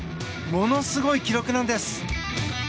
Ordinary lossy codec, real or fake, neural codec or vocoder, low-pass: none; real; none; none